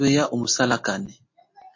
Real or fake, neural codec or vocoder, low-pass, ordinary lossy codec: real; none; 7.2 kHz; MP3, 32 kbps